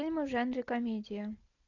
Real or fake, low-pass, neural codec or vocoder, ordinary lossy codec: real; 7.2 kHz; none; AAC, 48 kbps